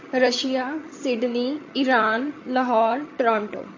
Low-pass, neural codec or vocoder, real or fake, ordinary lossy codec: 7.2 kHz; vocoder, 22.05 kHz, 80 mel bands, HiFi-GAN; fake; MP3, 32 kbps